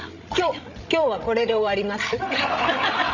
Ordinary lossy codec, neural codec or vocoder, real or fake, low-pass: none; codec, 16 kHz, 16 kbps, FreqCodec, larger model; fake; 7.2 kHz